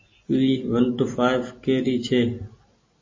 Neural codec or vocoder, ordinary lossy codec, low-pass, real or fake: none; MP3, 32 kbps; 7.2 kHz; real